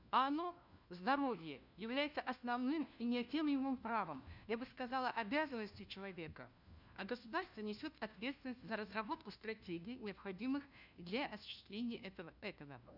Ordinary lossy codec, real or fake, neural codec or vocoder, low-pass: none; fake; codec, 16 kHz, 1 kbps, FunCodec, trained on LibriTTS, 50 frames a second; 5.4 kHz